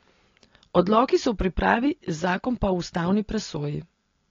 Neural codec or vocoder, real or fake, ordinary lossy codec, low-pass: none; real; AAC, 32 kbps; 7.2 kHz